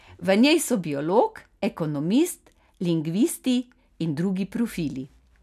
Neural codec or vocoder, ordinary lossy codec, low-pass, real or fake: none; none; 14.4 kHz; real